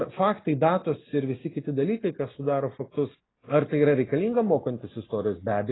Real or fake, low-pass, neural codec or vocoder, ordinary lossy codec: real; 7.2 kHz; none; AAC, 16 kbps